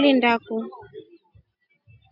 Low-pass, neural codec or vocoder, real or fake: 5.4 kHz; none; real